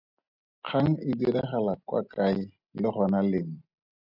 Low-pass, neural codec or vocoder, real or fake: 5.4 kHz; none; real